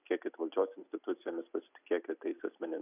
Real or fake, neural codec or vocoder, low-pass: real; none; 3.6 kHz